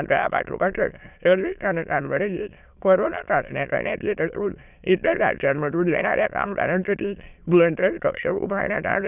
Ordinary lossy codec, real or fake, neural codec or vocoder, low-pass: none; fake; autoencoder, 22.05 kHz, a latent of 192 numbers a frame, VITS, trained on many speakers; 3.6 kHz